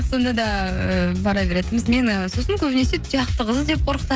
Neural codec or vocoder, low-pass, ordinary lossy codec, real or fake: codec, 16 kHz, 16 kbps, FreqCodec, smaller model; none; none; fake